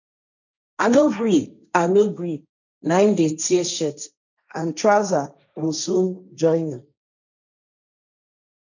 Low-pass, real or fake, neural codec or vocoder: 7.2 kHz; fake; codec, 16 kHz, 1.1 kbps, Voila-Tokenizer